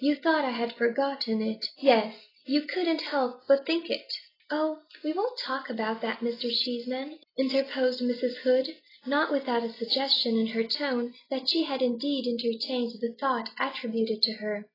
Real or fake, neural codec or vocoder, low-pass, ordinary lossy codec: real; none; 5.4 kHz; AAC, 24 kbps